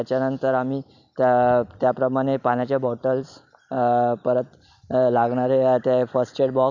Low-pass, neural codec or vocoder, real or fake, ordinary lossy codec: 7.2 kHz; none; real; none